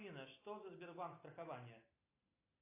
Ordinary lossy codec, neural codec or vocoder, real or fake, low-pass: AAC, 24 kbps; none; real; 3.6 kHz